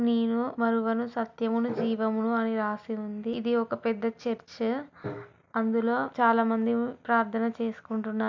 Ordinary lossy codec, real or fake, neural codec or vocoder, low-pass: MP3, 64 kbps; real; none; 7.2 kHz